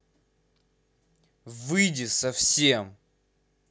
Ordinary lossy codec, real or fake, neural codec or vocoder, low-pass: none; real; none; none